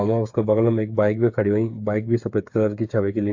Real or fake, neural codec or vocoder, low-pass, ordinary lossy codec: fake; codec, 16 kHz, 8 kbps, FreqCodec, smaller model; 7.2 kHz; AAC, 48 kbps